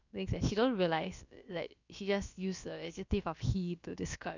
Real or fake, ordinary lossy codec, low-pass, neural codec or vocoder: fake; none; 7.2 kHz; codec, 16 kHz, about 1 kbps, DyCAST, with the encoder's durations